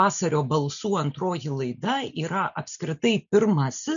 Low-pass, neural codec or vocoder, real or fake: 7.2 kHz; none; real